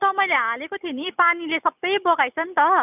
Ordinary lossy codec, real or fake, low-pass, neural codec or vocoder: none; real; 3.6 kHz; none